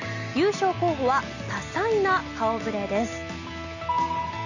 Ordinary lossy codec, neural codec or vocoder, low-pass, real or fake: none; none; 7.2 kHz; real